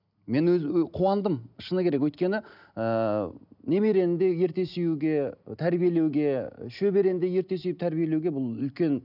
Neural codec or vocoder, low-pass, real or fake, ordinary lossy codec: none; 5.4 kHz; real; none